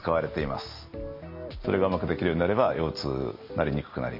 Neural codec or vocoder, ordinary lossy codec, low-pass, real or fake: none; MP3, 48 kbps; 5.4 kHz; real